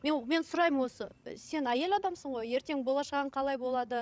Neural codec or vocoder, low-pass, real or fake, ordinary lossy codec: codec, 16 kHz, 16 kbps, FreqCodec, larger model; none; fake; none